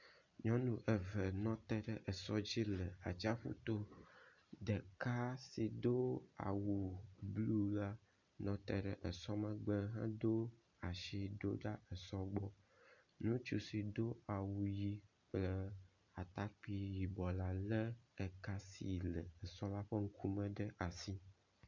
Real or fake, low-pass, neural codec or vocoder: real; 7.2 kHz; none